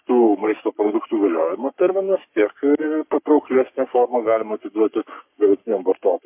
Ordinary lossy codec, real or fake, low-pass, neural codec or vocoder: MP3, 32 kbps; fake; 3.6 kHz; codec, 44.1 kHz, 3.4 kbps, Pupu-Codec